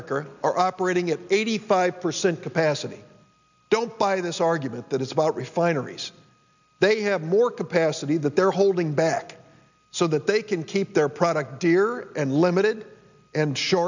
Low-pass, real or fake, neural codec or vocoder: 7.2 kHz; real; none